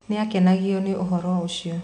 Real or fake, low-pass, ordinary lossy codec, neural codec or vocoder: real; 9.9 kHz; none; none